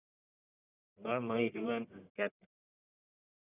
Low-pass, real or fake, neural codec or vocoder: 3.6 kHz; fake; codec, 44.1 kHz, 1.7 kbps, Pupu-Codec